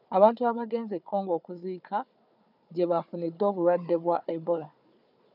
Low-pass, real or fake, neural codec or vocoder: 5.4 kHz; fake; codec, 16 kHz, 4 kbps, FunCodec, trained on Chinese and English, 50 frames a second